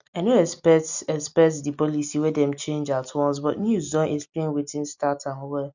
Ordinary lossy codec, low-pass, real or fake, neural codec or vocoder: none; 7.2 kHz; real; none